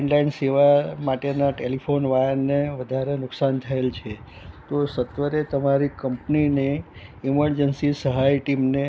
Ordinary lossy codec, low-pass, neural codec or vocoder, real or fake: none; none; none; real